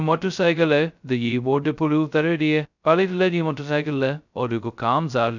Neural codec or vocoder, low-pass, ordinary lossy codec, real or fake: codec, 16 kHz, 0.2 kbps, FocalCodec; 7.2 kHz; none; fake